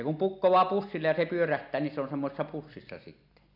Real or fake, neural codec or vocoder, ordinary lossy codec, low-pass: real; none; none; 5.4 kHz